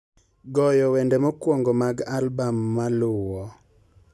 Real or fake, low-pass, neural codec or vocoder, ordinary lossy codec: real; none; none; none